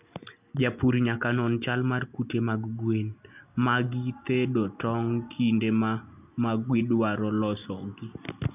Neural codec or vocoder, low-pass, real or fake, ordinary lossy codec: none; 3.6 kHz; real; none